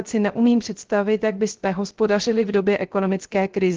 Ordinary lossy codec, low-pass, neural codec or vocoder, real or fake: Opus, 16 kbps; 7.2 kHz; codec, 16 kHz, 0.3 kbps, FocalCodec; fake